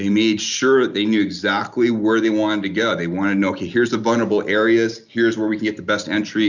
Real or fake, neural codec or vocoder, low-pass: real; none; 7.2 kHz